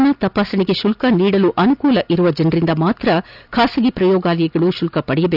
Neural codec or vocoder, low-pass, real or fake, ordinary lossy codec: none; 5.4 kHz; real; none